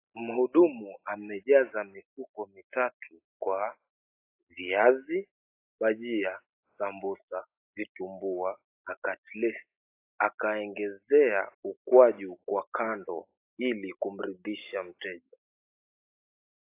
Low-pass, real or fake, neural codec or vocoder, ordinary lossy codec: 3.6 kHz; real; none; AAC, 24 kbps